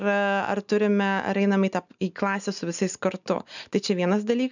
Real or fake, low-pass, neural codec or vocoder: real; 7.2 kHz; none